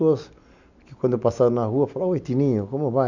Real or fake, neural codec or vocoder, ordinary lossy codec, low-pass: real; none; none; 7.2 kHz